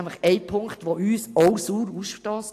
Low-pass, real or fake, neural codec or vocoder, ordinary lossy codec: 14.4 kHz; real; none; AAC, 64 kbps